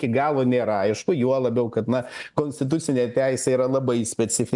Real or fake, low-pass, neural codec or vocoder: real; 10.8 kHz; none